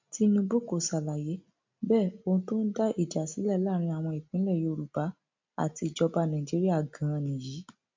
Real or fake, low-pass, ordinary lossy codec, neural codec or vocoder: real; 7.2 kHz; MP3, 64 kbps; none